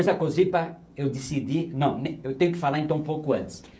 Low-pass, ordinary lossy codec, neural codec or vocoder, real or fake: none; none; codec, 16 kHz, 16 kbps, FreqCodec, smaller model; fake